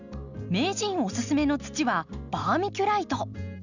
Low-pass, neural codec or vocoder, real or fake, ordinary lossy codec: 7.2 kHz; none; real; none